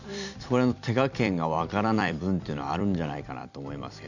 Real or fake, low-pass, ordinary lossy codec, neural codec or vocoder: fake; 7.2 kHz; none; vocoder, 44.1 kHz, 128 mel bands every 256 samples, BigVGAN v2